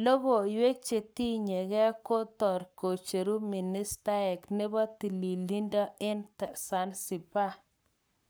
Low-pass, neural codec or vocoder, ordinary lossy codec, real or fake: none; codec, 44.1 kHz, 7.8 kbps, Pupu-Codec; none; fake